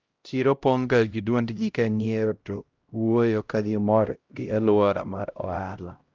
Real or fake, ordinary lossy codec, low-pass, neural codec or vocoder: fake; Opus, 24 kbps; 7.2 kHz; codec, 16 kHz, 0.5 kbps, X-Codec, HuBERT features, trained on LibriSpeech